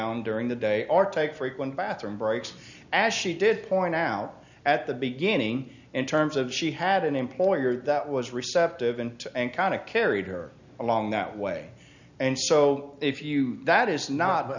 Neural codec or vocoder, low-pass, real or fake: none; 7.2 kHz; real